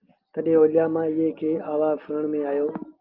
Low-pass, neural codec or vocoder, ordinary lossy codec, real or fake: 5.4 kHz; none; Opus, 32 kbps; real